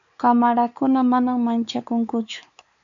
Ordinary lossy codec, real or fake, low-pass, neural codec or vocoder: MP3, 64 kbps; fake; 7.2 kHz; codec, 16 kHz, 4 kbps, X-Codec, WavLM features, trained on Multilingual LibriSpeech